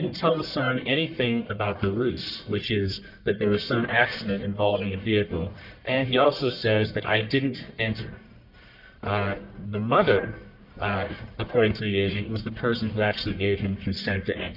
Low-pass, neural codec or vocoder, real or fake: 5.4 kHz; codec, 44.1 kHz, 1.7 kbps, Pupu-Codec; fake